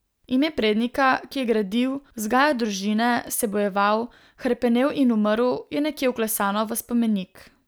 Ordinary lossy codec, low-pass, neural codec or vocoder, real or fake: none; none; none; real